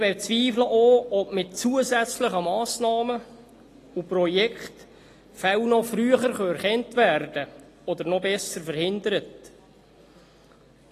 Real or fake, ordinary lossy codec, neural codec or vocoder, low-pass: real; AAC, 48 kbps; none; 14.4 kHz